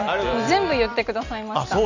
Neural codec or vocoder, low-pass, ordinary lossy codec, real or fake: none; 7.2 kHz; none; real